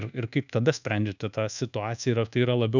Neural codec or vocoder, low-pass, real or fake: codec, 24 kHz, 1.2 kbps, DualCodec; 7.2 kHz; fake